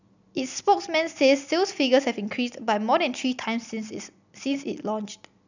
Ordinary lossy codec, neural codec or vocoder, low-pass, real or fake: none; none; 7.2 kHz; real